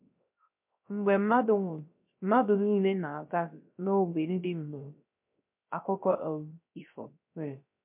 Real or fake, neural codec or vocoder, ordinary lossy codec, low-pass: fake; codec, 16 kHz, 0.3 kbps, FocalCodec; MP3, 32 kbps; 3.6 kHz